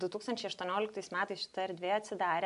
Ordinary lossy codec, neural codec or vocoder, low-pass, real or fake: AAC, 64 kbps; none; 10.8 kHz; real